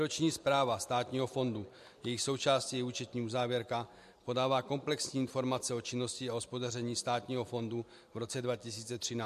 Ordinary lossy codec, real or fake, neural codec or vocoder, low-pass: MP3, 64 kbps; real; none; 14.4 kHz